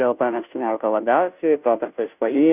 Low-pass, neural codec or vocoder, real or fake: 3.6 kHz; codec, 16 kHz, 0.5 kbps, FunCodec, trained on Chinese and English, 25 frames a second; fake